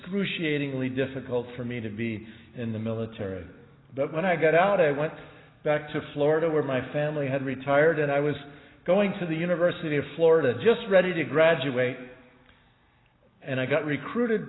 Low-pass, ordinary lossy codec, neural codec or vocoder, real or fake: 7.2 kHz; AAC, 16 kbps; none; real